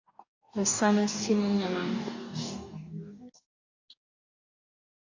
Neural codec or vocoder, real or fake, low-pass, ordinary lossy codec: codec, 44.1 kHz, 2.6 kbps, DAC; fake; 7.2 kHz; AAC, 48 kbps